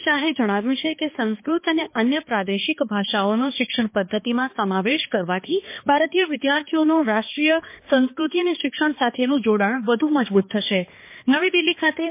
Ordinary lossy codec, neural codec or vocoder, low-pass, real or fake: MP3, 24 kbps; codec, 16 kHz, 2 kbps, X-Codec, HuBERT features, trained on balanced general audio; 3.6 kHz; fake